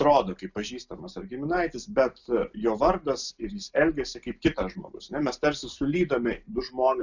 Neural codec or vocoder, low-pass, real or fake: none; 7.2 kHz; real